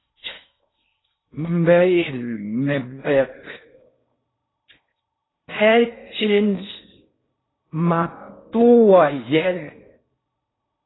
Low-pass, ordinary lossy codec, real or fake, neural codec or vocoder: 7.2 kHz; AAC, 16 kbps; fake; codec, 16 kHz in and 24 kHz out, 0.6 kbps, FocalCodec, streaming, 4096 codes